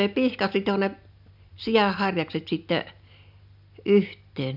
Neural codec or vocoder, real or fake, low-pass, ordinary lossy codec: none; real; 5.4 kHz; none